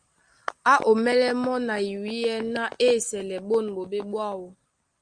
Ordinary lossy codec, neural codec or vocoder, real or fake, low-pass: Opus, 32 kbps; none; real; 9.9 kHz